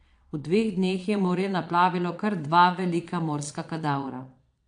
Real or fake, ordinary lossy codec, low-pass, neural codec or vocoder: fake; AAC, 64 kbps; 9.9 kHz; vocoder, 22.05 kHz, 80 mel bands, Vocos